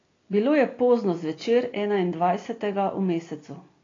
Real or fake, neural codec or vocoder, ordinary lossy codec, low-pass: real; none; AAC, 32 kbps; 7.2 kHz